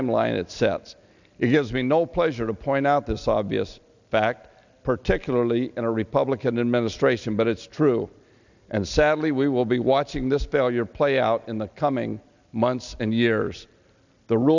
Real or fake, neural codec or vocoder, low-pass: real; none; 7.2 kHz